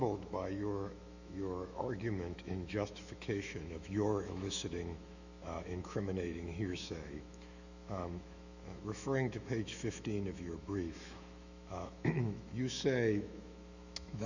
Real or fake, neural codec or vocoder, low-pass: fake; autoencoder, 48 kHz, 128 numbers a frame, DAC-VAE, trained on Japanese speech; 7.2 kHz